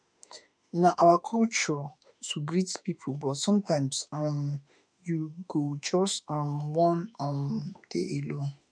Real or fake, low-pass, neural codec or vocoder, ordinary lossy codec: fake; 9.9 kHz; autoencoder, 48 kHz, 32 numbers a frame, DAC-VAE, trained on Japanese speech; none